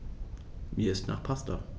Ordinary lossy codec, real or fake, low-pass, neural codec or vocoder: none; real; none; none